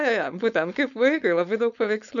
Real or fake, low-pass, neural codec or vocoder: fake; 7.2 kHz; codec, 16 kHz, 4.8 kbps, FACodec